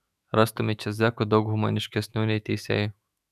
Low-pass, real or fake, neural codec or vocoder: 14.4 kHz; fake; autoencoder, 48 kHz, 128 numbers a frame, DAC-VAE, trained on Japanese speech